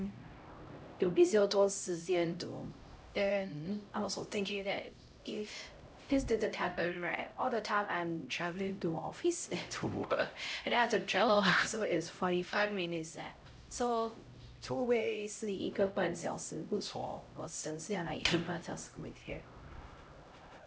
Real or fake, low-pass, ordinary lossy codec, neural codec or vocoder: fake; none; none; codec, 16 kHz, 0.5 kbps, X-Codec, HuBERT features, trained on LibriSpeech